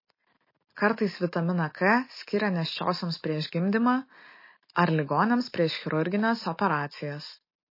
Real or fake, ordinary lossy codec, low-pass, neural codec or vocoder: real; MP3, 24 kbps; 5.4 kHz; none